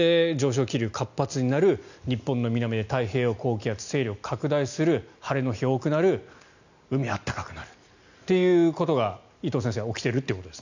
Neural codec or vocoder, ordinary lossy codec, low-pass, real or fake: none; none; 7.2 kHz; real